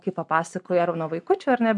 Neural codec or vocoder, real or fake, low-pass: none; real; 10.8 kHz